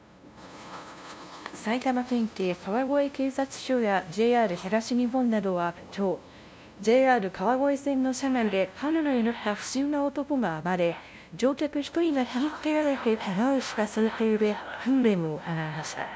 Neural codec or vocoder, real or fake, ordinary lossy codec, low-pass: codec, 16 kHz, 0.5 kbps, FunCodec, trained on LibriTTS, 25 frames a second; fake; none; none